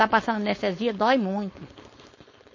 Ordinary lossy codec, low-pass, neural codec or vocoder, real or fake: MP3, 32 kbps; 7.2 kHz; codec, 16 kHz, 4.8 kbps, FACodec; fake